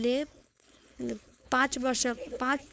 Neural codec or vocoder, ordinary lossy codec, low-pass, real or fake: codec, 16 kHz, 4.8 kbps, FACodec; none; none; fake